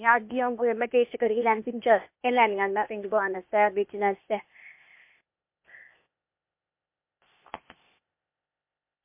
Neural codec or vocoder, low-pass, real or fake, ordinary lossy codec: codec, 16 kHz, 0.8 kbps, ZipCodec; 3.6 kHz; fake; MP3, 32 kbps